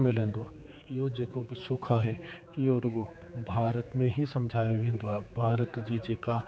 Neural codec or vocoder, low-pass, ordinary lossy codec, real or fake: codec, 16 kHz, 4 kbps, X-Codec, HuBERT features, trained on general audio; none; none; fake